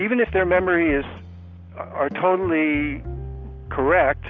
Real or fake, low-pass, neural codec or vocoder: real; 7.2 kHz; none